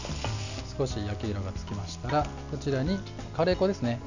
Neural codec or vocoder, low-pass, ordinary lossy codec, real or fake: none; 7.2 kHz; none; real